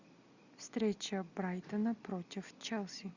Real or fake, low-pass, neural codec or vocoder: real; 7.2 kHz; none